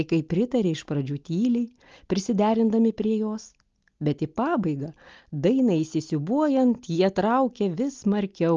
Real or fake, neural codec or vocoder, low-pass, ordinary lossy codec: real; none; 7.2 kHz; Opus, 32 kbps